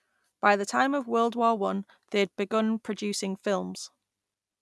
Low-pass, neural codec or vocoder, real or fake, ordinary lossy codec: none; none; real; none